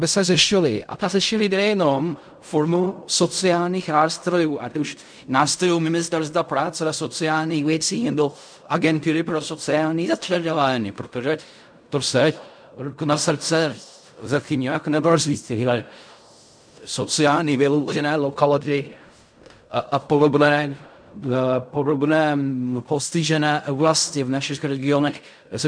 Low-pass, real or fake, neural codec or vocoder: 9.9 kHz; fake; codec, 16 kHz in and 24 kHz out, 0.4 kbps, LongCat-Audio-Codec, fine tuned four codebook decoder